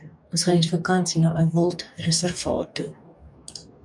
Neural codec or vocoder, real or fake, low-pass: codec, 44.1 kHz, 2.6 kbps, DAC; fake; 10.8 kHz